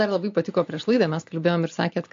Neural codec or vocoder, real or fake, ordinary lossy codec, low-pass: none; real; MP3, 48 kbps; 7.2 kHz